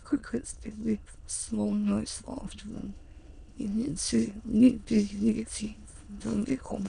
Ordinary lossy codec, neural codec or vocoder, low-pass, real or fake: MP3, 96 kbps; autoencoder, 22.05 kHz, a latent of 192 numbers a frame, VITS, trained on many speakers; 9.9 kHz; fake